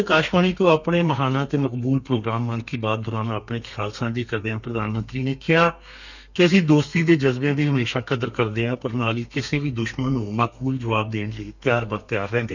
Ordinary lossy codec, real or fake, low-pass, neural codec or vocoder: none; fake; 7.2 kHz; codec, 32 kHz, 1.9 kbps, SNAC